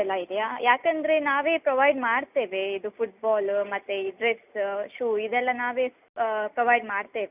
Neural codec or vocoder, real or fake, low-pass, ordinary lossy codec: none; real; 3.6 kHz; none